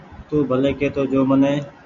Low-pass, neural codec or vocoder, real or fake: 7.2 kHz; none; real